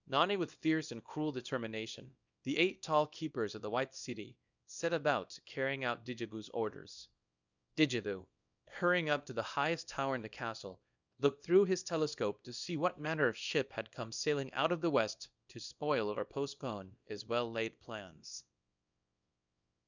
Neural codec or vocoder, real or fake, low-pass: codec, 24 kHz, 0.9 kbps, WavTokenizer, small release; fake; 7.2 kHz